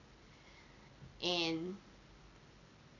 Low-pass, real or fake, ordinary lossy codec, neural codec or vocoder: 7.2 kHz; real; none; none